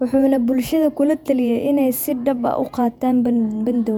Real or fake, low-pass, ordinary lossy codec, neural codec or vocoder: fake; 19.8 kHz; none; vocoder, 48 kHz, 128 mel bands, Vocos